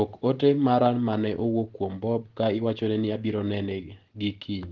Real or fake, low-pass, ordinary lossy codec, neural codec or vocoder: real; 7.2 kHz; Opus, 16 kbps; none